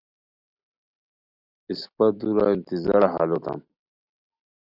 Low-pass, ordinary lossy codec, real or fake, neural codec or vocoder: 5.4 kHz; Opus, 64 kbps; real; none